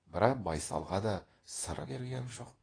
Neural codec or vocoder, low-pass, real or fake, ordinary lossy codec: codec, 24 kHz, 0.9 kbps, WavTokenizer, medium speech release version 2; 9.9 kHz; fake; AAC, 32 kbps